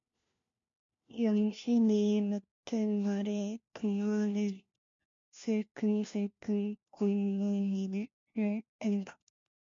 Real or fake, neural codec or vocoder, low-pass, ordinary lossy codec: fake; codec, 16 kHz, 1 kbps, FunCodec, trained on LibriTTS, 50 frames a second; 7.2 kHz; MP3, 48 kbps